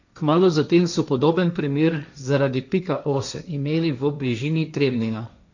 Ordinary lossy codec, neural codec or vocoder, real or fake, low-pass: none; codec, 16 kHz, 1.1 kbps, Voila-Tokenizer; fake; 7.2 kHz